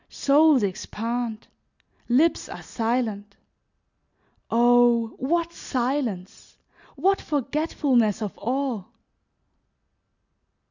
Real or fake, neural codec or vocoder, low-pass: real; none; 7.2 kHz